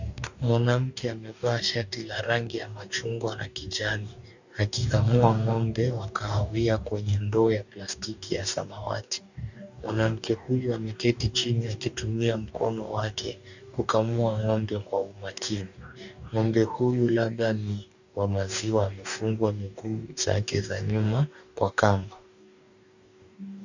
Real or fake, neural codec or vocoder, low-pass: fake; codec, 44.1 kHz, 2.6 kbps, DAC; 7.2 kHz